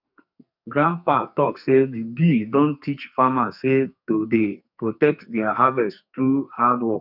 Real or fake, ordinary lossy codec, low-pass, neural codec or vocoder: fake; none; 5.4 kHz; codec, 44.1 kHz, 2.6 kbps, SNAC